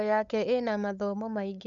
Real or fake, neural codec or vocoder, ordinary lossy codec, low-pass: fake; codec, 16 kHz, 8 kbps, FunCodec, trained on LibriTTS, 25 frames a second; none; 7.2 kHz